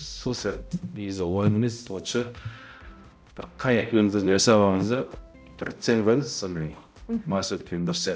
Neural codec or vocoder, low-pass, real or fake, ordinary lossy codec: codec, 16 kHz, 0.5 kbps, X-Codec, HuBERT features, trained on balanced general audio; none; fake; none